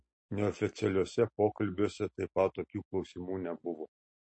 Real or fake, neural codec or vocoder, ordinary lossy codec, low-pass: real; none; MP3, 32 kbps; 9.9 kHz